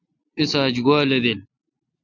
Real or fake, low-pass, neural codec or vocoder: real; 7.2 kHz; none